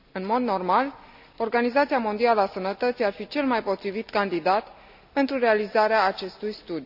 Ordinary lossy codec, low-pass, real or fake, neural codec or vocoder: none; 5.4 kHz; real; none